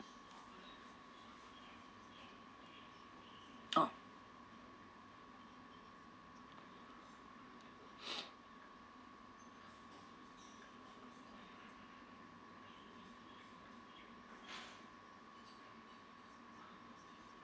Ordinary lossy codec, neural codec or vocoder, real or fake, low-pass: none; none; real; none